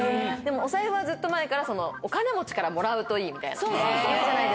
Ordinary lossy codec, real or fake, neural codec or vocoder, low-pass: none; real; none; none